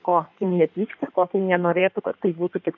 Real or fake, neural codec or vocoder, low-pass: fake; codec, 16 kHz in and 24 kHz out, 1.1 kbps, FireRedTTS-2 codec; 7.2 kHz